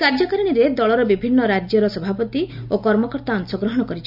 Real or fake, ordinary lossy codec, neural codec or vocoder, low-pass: real; none; none; 5.4 kHz